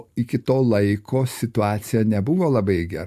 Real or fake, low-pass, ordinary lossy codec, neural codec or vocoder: real; 14.4 kHz; MP3, 64 kbps; none